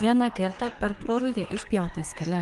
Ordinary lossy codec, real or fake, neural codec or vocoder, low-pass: Opus, 24 kbps; fake; codec, 24 kHz, 1 kbps, SNAC; 10.8 kHz